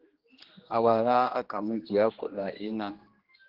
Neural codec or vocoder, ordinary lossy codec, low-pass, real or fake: codec, 16 kHz, 1 kbps, X-Codec, HuBERT features, trained on general audio; Opus, 16 kbps; 5.4 kHz; fake